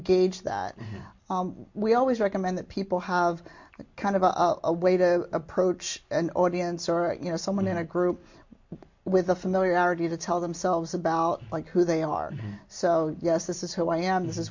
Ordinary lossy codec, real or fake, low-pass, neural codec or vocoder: MP3, 48 kbps; real; 7.2 kHz; none